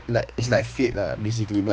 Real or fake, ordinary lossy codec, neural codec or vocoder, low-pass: fake; none; codec, 16 kHz, 2 kbps, X-Codec, HuBERT features, trained on balanced general audio; none